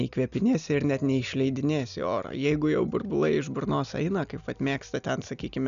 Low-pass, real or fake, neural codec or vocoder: 7.2 kHz; real; none